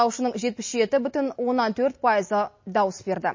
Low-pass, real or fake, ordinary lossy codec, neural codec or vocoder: 7.2 kHz; real; MP3, 32 kbps; none